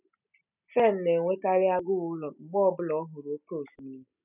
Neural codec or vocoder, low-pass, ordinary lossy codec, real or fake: none; 3.6 kHz; none; real